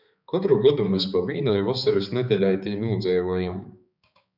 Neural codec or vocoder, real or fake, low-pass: codec, 16 kHz, 4 kbps, X-Codec, HuBERT features, trained on balanced general audio; fake; 5.4 kHz